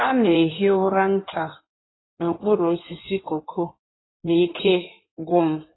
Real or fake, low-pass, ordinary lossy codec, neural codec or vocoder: fake; 7.2 kHz; AAC, 16 kbps; codec, 16 kHz in and 24 kHz out, 1.1 kbps, FireRedTTS-2 codec